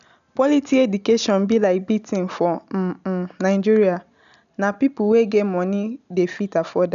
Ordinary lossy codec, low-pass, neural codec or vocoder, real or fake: none; 7.2 kHz; none; real